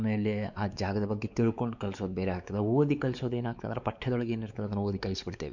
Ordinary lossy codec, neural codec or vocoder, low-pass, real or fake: none; codec, 16 kHz, 4 kbps, X-Codec, WavLM features, trained on Multilingual LibriSpeech; 7.2 kHz; fake